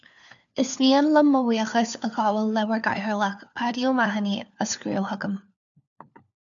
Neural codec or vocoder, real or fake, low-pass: codec, 16 kHz, 4 kbps, FunCodec, trained on LibriTTS, 50 frames a second; fake; 7.2 kHz